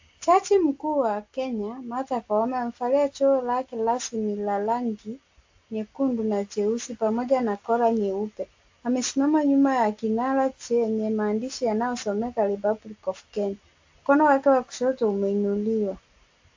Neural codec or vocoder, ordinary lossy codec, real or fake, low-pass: none; AAC, 48 kbps; real; 7.2 kHz